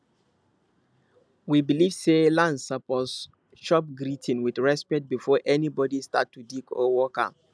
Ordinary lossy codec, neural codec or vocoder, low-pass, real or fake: none; none; none; real